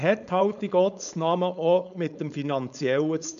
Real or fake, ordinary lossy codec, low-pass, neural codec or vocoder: fake; MP3, 96 kbps; 7.2 kHz; codec, 16 kHz, 4.8 kbps, FACodec